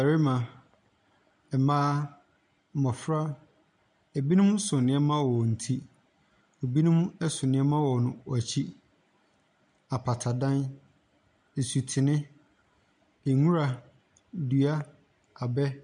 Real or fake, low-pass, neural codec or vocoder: real; 9.9 kHz; none